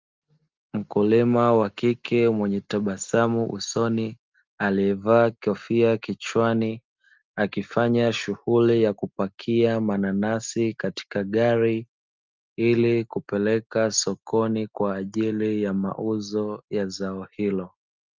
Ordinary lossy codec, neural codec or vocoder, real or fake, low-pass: Opus, 24 kbps; none; real; 7.2 kHz